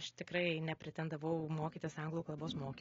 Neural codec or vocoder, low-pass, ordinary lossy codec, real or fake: none; 7.2 kHz; AAC, 24 kbps; real